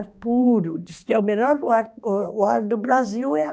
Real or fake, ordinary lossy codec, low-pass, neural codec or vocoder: fake; none; none; codec, 16 kHz, 2 kbps, X-Codec, HuBERT features, trained on balanced general audio